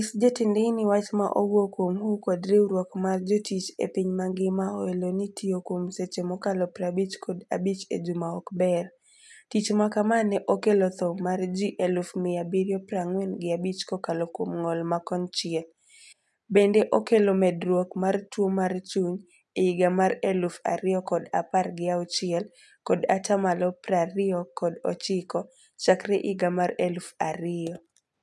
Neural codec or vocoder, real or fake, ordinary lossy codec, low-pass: none; real; none; none